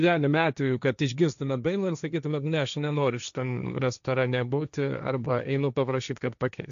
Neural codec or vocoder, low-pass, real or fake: codec, 16 kHz, 1.1 kbps, Voila-Tokenizer; 7.2 kHz; fake